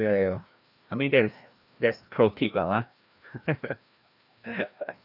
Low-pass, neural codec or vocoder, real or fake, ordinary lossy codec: 5.4 kHz; codec, 16 kHz, 1 kbps, FreqCodec, larger model; fake; none